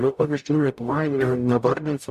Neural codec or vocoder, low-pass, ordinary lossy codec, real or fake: codec, 44.1 kHz, 0.9 kbps, DAC; 14.4 kHz; MP3, 96 kbps; fake